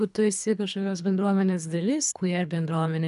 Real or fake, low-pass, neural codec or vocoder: fake; 10.8 kHz; codec, 24 kHz, 3 kbps, HILCodec